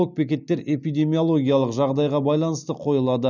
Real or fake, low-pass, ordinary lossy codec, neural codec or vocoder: real; 7.2 kHz; none; none